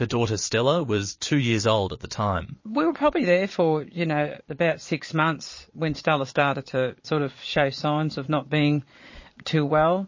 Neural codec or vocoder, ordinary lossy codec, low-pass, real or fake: vocoder, 22.05 kHz, 80 mel bands, Vocos; MP3, 32 kbps; 7.2 kHz; fake